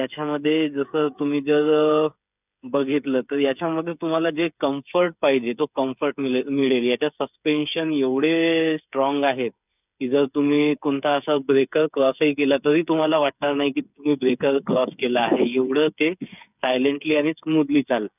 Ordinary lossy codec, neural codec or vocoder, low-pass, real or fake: none; codec, 16 kHz, 8 kbps, FreqCodec, smaller model; 3.6 kHz; fake